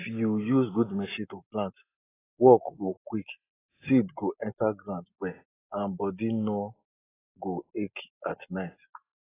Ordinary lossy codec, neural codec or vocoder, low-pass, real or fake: AAC, 16 kbps; none; 3.6 kHz; real